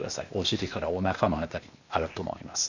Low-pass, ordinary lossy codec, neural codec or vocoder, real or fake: 7.2 kHz; none; codec, 16 kHz, 0.8 kbps, ZipCodec; fake